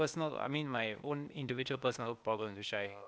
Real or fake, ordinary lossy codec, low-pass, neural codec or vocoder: fake; none; none; codec, 16 kHz, 0.8 kbps, ZipCodec